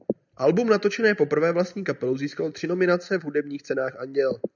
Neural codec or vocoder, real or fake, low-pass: none; real; 7.2 kHz